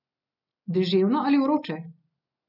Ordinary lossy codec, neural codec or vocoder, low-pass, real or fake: none; none; 5.4 kHz; real